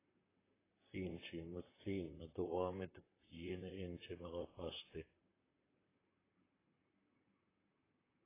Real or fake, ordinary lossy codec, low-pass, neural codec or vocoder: fake; AAC, 16 kbps; 3.6 kHz; vocoder, 44.1 kHz, 128 mel bands, Pupu-Vocoder